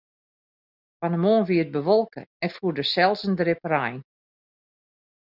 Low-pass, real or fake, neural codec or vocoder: 5.4 kHz; real; none